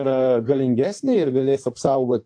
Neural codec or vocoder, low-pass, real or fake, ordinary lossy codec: codec, 16 kHz in and 24 kHz out, 1.1 kbps, FireRedTTS-2 codec; 9.9 kHz; fake; AAC, 64 kbps